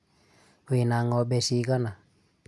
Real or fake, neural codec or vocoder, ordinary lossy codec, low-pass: real; none; none; none